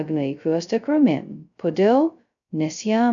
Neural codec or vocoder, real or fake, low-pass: codec, 16 kHz, 0.2 kbps, FocalCodec; fake; 7.2 kHz